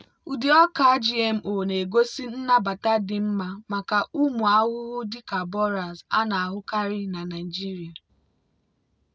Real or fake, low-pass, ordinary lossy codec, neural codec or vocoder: real; none; none; none